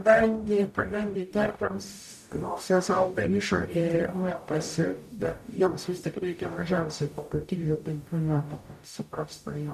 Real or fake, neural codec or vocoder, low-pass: fake; codec, 44.1 kHz, 0.9 kbps, DAC; 14.4 kHz